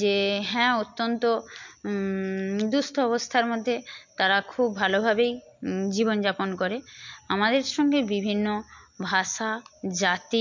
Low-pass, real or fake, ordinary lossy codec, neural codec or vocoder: 7.2 kHz; real; MP3, 64 kbps; none